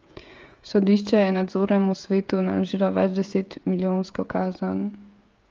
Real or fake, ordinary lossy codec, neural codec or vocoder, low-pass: fake; Opus, 32 kbps; codec, 16 kHz, 16 kbps, FreqCodec, smaller model; 7.2 kHz